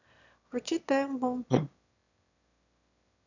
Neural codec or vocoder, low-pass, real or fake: autoencoder, 22.05 kHz, a latent of 192 numbers a frame, VITS, trained on one speaker; 7.2 kHz; fake